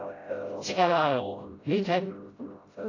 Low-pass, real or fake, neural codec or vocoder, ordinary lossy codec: 7.2 kHz; fake; codec, 16 kHz, 0.5 kbps, FreqCodec, smaller model; AAC, 32 kbps